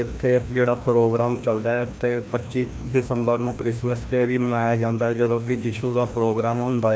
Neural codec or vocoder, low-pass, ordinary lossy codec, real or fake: codec, 16 kHz, 1 kbps, FreqCodec, larger model; none; none; fake